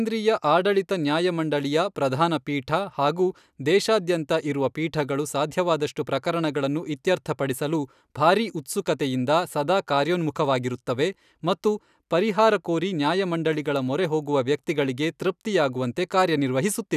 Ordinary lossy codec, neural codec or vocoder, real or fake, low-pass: none; none; real; 14.4 kHz